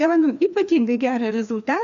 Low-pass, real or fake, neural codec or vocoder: 7.2 kHz; fake; codec, 16 kHz, 2 kbps, FreqCodec, larger model